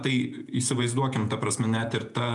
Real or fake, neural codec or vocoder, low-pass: fake; vocoder, 48 kHz, 128 mel bands, Vocos; 10.8 kHz